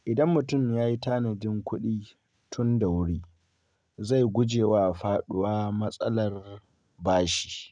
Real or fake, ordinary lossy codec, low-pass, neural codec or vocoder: real; none; 9.9 kHz; none